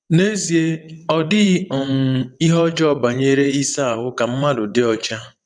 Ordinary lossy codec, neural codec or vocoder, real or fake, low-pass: none; vocoder, 22.05 kHz, 80 mel bands, WaveNeXt; fake; 9.9 kHz